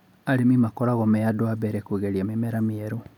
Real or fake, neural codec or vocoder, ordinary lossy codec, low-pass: real; none; none; 19.8 kHz